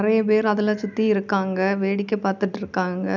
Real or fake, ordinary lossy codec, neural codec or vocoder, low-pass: real; none; none; 7.2 kHz